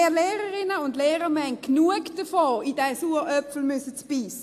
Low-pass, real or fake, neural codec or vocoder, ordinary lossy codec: 14.4 kHz; real; none; AAC, 64 kbps